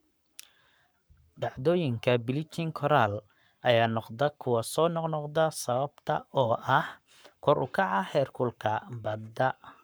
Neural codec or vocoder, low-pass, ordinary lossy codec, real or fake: codec, 44.1 kHz, 7.8 kbps, Pupu-Codec; none; none; fake